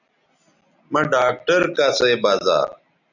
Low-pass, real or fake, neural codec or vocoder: 7.2 kHz; real; none